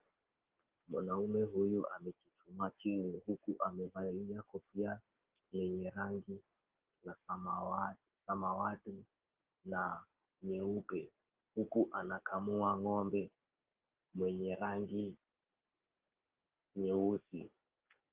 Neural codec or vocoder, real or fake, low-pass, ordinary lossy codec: none; real; 3.6 kHz; Opus, 16 kbps